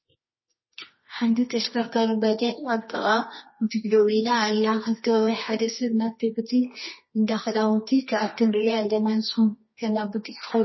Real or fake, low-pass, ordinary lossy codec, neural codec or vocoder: fake; 7.2 kHz; MP3, 24 kbps; codec, 24 kHz, 0.9 kbps, WavTokenizer, medium music audio release